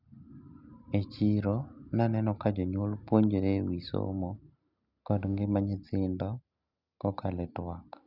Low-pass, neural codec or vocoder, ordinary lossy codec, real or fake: 5.4 kHz; none; none; real